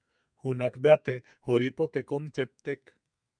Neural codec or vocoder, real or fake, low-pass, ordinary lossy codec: codec, 32 kHz, 1.9 kbps, SNAC; fake; 9.9 kHz; MP3, 96 kbps